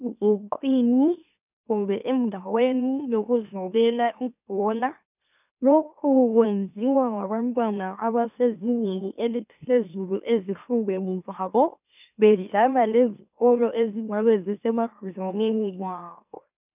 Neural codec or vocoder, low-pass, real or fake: autoencoder, 44.1 kHz, a latent of 192 numbers a frame, MeloTTS; 3.6 kHz; fake